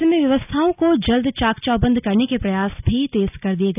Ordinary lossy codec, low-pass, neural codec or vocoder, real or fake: none; 3.6 kHz; none; real